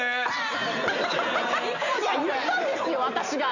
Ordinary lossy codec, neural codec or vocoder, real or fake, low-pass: none; none; real; 7.2 kHz